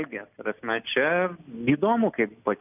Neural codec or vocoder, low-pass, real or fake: none; 3.6 kHz; real